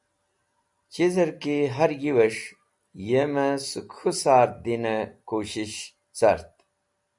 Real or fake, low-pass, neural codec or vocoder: real; 10.8 kHz; none